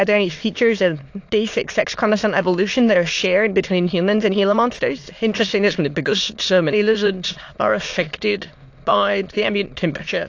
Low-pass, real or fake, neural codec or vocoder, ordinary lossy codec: 7.2 kHz; fake; autoencoder, 22.05 kHz, a latent of 192 numbers a frame, VITS, trained on many speakers; AAC, 48 kbps